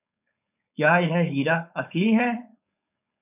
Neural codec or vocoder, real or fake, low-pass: codec, 16 kHz, 4.8 kbps, FACodec; fake; 3.6 kHz